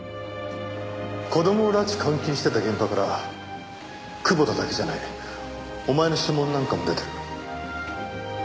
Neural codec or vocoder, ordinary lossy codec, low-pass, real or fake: none; none; none; real